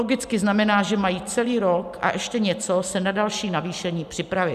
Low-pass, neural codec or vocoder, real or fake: 14.4 kHz; none; real